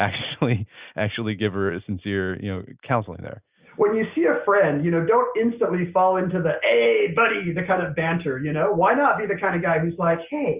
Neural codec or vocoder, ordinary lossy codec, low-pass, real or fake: none; Opus, 64 kbps; 3.6 kHz; real